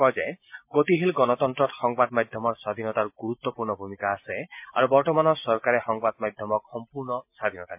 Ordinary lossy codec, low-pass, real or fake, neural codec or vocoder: MP3, 32 kbps; 3.6 kHz; real; none